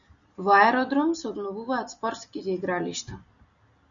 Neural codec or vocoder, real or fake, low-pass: none; real; 7.2 kHz